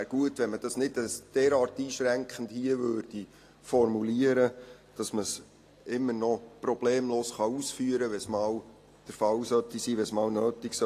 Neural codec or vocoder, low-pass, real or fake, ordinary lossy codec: none; 14.4 kHz; real; AAC, 48 kbps